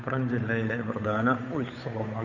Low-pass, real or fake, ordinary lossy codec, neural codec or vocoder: 7.2 kHz; fake; none; codec, 16 kHz, 8 kbps, FunCodec, trained on Chinese and English, 25 frames a second